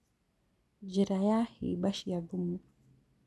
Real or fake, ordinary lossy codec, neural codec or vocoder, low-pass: real; none; none; none